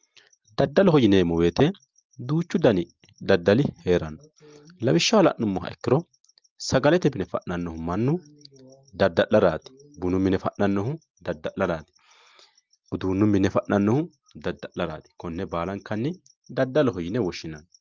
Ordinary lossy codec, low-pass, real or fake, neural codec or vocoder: Opus, 24 kbps; 7.2 kHz; real; none